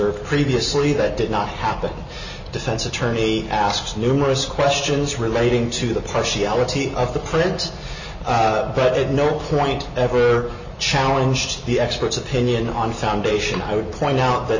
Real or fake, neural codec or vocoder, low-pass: real; none; 7.2 kHz